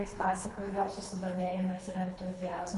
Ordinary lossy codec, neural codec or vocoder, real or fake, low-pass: Opus, 64 kbps; codec, 24 kHz, 3 kbps, HILCodec; fake; 10.8 kHz